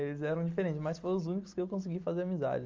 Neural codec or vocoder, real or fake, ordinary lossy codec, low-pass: none; real; Opus, 32 kbps; 7.2 kHz